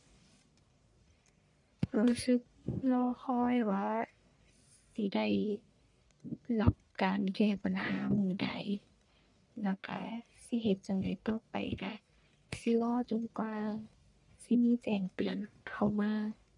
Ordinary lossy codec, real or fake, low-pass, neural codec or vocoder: none; fake; 10.8 kHz; codec, 44.1 kHz, 1.7 kbps, Pupu-Codec